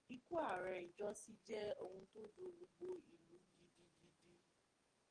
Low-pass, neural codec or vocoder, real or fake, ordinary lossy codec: 9.9 kHz; codec, 44.1 kHz, 7.8 kbps, DAC; fake; Opus, 24 kbps